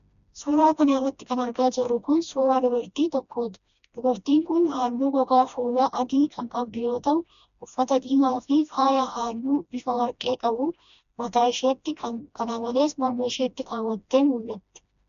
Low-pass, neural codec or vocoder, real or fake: 7.2 kHz; codec, 16 kHz, 1 kbps, FreqCodec, smaller model; fake